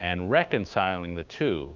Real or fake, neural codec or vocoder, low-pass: fake; codec, 16 kHz, 6 kbps, DAC; 7.2 kHz